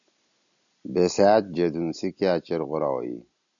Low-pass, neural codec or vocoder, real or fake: 7.2 kHz; none; real